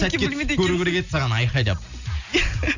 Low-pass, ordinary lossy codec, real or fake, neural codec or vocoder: 7.2 kHz; none; real; none